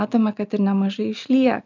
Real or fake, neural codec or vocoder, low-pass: real; none; 7.2 kHz